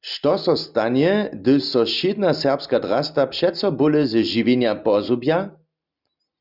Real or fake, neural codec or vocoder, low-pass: real; none; 5.4 kHz